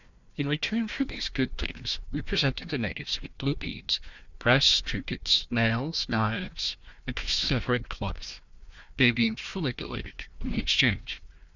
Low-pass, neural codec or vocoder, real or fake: 7.2 kHz; codec, 16 kHz, 1 kbps, FunCodec, trained on Chinese and English, 50 frames a second; fake